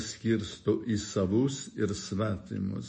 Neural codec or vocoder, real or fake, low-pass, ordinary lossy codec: autoencoder, 48 kHz, 128 numbers a frame, DAC-VAE, trained on Japanese speech; fake; 10.8 kHz; MP3, 32 kbps